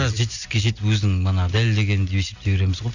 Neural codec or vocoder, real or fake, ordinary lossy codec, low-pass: none; real; none; 7.2 kHz